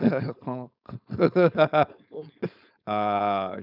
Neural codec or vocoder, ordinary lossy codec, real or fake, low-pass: codec, 16 kHz, 4.8 kbps, FACodec; none; fake; 5.4 kHz